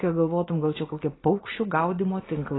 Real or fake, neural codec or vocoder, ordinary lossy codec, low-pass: real; none; AAC, 16 kbps; 7.2 kHz